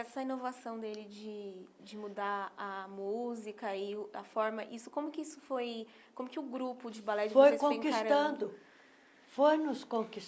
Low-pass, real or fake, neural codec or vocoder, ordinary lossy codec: none; real; none; none